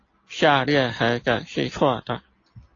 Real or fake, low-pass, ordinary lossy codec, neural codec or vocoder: real; 7.2 kHz; AAC, 32 kbps; none